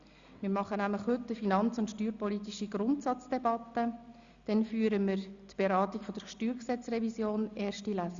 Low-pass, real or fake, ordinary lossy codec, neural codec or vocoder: 7.2 kHz; real; Opus, 64 kbps; none